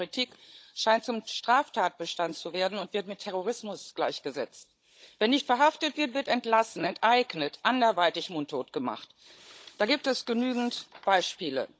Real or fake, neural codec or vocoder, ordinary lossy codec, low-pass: fake; codec, 16 kHz, 16 kbps, FunCodec, trained on Chinese and English, 50 frames a second; none; none